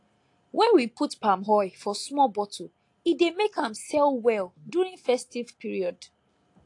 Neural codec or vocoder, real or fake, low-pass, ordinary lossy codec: none; real; 10.8 kHz; AAC, 48 kbps